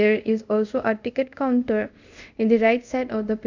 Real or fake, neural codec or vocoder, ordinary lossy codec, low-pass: fake; codec, 24 kHz, 0.5 kbps, DualCodec; none; 7.2 kHz